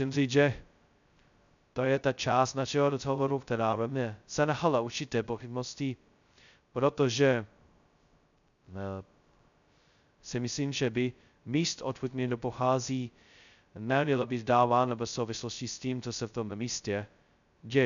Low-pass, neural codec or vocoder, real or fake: 7.2 kHz; codec, 16 kHz, 0.2 kbps, FocalCodec; fake